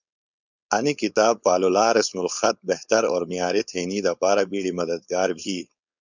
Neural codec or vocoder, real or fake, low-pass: codec, 16 kHz, 4.8 kbps, FACodec; fake; 7.2 kHz